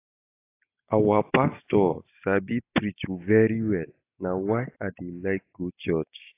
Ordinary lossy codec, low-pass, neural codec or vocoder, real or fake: AAC, 24 kbps; 3.6 kHz; none; real